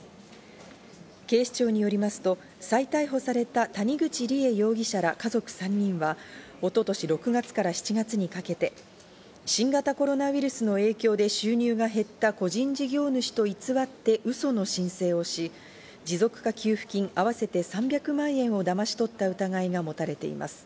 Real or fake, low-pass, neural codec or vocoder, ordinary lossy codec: real; none; none; none